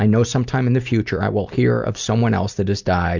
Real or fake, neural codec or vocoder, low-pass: real; none; 7.2 kHz